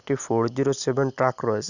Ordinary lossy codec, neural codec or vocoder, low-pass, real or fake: none; none; 7.2 kHz; real